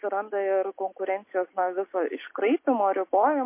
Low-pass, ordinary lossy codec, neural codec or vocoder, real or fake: 3.6 kHz; MP3, 24 kbps; none; real